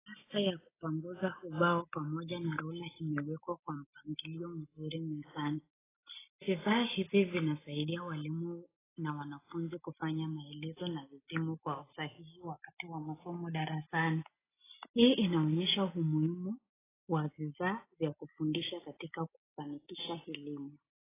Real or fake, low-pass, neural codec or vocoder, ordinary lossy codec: real; 3.6 kHz; none; AAC, 16 kbps